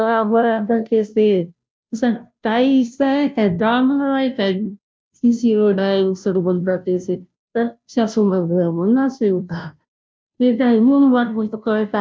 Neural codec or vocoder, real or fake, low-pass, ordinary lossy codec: codec, 16 kHz, 0.5 kbps, FunCodec, trained on Chinese and English, 25 frames a second; fake; none; none